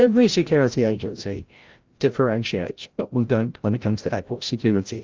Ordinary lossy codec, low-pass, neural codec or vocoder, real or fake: Opus, 32 kbps; 7.2 kHz; codec, 16 kHz, 0.5 kbps, FreqCodec, larger model; fake